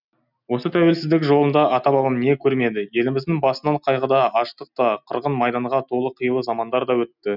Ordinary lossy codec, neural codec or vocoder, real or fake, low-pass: none; none; real; 5.4 kHz